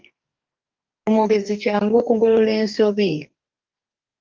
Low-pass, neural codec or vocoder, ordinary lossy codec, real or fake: 7.2 kHz; codec, 44.1 kHz, 2.6 kbps, DAC; Opus, 24 kbps; fake